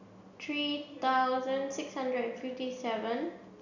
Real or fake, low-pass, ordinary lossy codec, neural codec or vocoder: real; 7.2 kHz; none; none